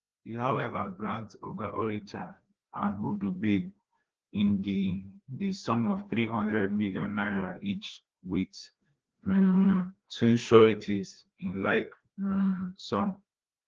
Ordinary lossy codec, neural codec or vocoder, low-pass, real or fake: Opus, 16 kbps; codec, 16 kHz, 1 kbps, FreqCodec, larger model; 7.2 kHz; fake